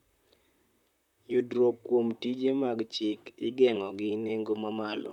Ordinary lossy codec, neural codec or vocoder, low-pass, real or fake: none; vocoder, 44.1 kHz, 128 mel bands, Pupu-Vocoder; 19.8 kHz; fake